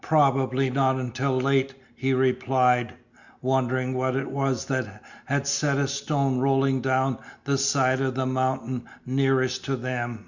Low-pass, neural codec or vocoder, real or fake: 7.2 kHz; none; real